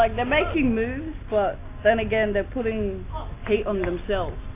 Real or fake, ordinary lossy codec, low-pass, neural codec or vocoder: real; AAC, 24 kbps; 3.6 kHz; none